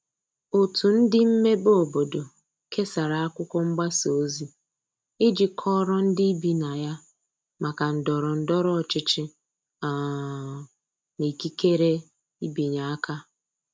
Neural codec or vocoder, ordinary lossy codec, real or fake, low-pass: none; none; real; none